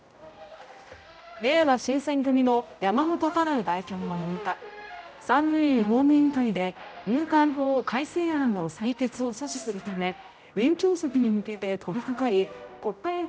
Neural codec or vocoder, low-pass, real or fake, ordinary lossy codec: codec, 16 kHz, 0.5 kbps, X-Codec, HuBERT features, trained on general audio; none; fake; none